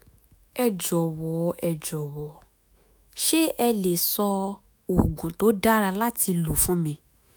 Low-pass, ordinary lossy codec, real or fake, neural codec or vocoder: none; none; fake; autoencoder, 48 kHz, 128 numbers a frame, DAC-VAE, trained on Japanese speech